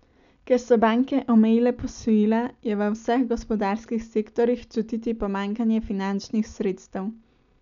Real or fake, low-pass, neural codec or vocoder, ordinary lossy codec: real; 7.2 kHz; none; none